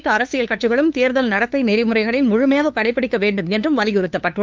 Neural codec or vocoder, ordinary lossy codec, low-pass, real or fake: codec, 16 kHz, 2 kbps, FunCodec, trained on LibriTTS, 25 frames a second; Opus, 32 kbps; 7.2 kHz; fake